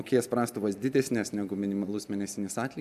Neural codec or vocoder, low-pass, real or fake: none; 14.4 kHz; real